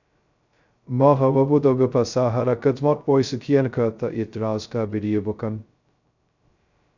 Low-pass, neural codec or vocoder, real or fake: 7.2 kHz; codec, 16 kHz, 0.2 kbps, FocalCodec; fake